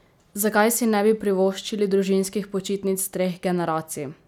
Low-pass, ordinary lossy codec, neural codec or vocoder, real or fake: 19.8 kHz; none; none; real